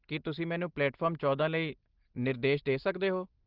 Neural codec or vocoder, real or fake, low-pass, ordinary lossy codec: none; real; 5.4 kHz; Opus, 16 kbps